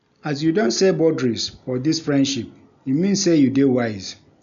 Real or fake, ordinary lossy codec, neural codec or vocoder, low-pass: real; none; none; 7.2 kHz